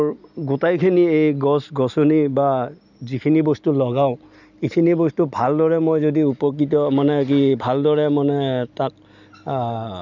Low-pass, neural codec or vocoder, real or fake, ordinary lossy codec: 7.2 kHz; none; real; none